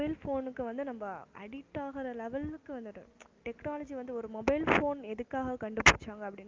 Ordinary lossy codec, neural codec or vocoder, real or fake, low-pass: Opus, 24 kbps; none; real; 7.2 kHz